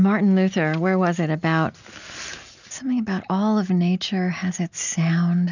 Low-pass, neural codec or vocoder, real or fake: 7.2 kHz; none; real